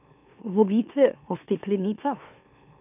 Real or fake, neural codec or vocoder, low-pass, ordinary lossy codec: fake; autoencoder, 44.1 kHz, a latent of 192 numbers a frame, MeloTTS; 3.6 kHz; none